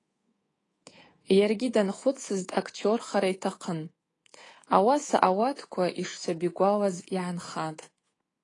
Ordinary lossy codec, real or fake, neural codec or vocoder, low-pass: AAC, 32 kbps; fake; codec, 24 kHz, 3.1 kbps, DualCodec; 10.8 kHz